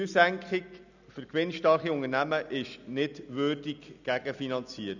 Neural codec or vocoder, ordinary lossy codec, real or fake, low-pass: none; none; real; 7.2 kHz